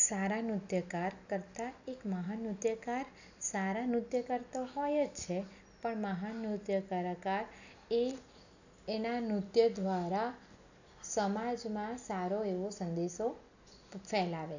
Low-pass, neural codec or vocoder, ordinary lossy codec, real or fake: 7.2 kHz; none; none; real